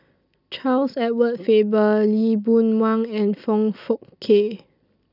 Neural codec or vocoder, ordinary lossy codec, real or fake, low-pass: none; none; real; 5.4 kHz